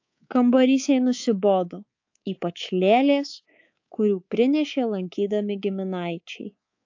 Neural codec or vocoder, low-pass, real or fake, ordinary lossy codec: codec, 24 kHz, 3.1 kbps, DualCodec; 7.2 kHz; fake; AAC, 48 kbps